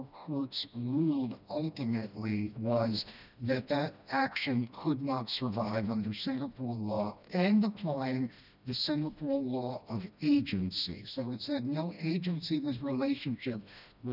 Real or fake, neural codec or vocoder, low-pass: fake; codec, 16 kHz, 1 kbps, FreqCodec, smaller model; 5.4 kHz